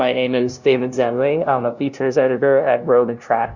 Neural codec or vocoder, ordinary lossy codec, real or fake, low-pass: codec, 16 kHz, 0.5 kbps, FunCodec, trained on LibriTTS, 25 frames a second; Opus, 64 kbps; fake; 7.2 kHz